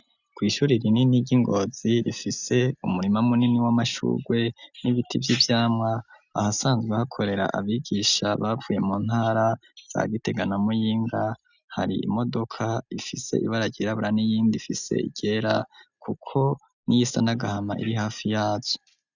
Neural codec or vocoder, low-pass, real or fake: none; 7.2 kHz; real